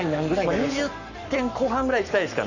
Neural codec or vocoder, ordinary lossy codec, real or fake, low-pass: codec, 44.1 kHz, 7.8 kbps, Pupu-Codec; none; fake; 7.2 kHz